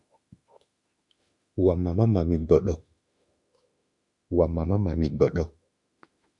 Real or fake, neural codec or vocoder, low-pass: fake; autoencoder, 48 kHz, 32 numbers a frame, DAC-VAE, trained on Japanese speech; 10.8 kHz